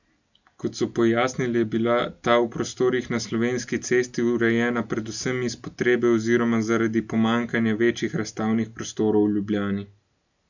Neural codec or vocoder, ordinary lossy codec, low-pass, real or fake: none; none; 7.2 kHz; real